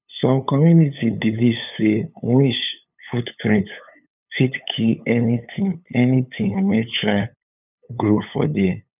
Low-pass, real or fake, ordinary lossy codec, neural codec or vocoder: 3.6 kHz; fake; none; codec, 16 kHz, 8 kbps, FunCodec, trained on LibriTTS, 25 frames a second